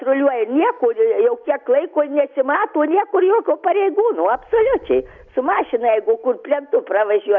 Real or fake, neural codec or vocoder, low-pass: real; none; 7.2 kHz